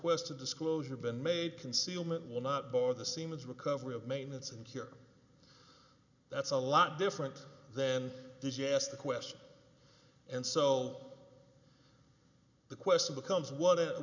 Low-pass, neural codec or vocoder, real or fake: 7.2 kHz; none; real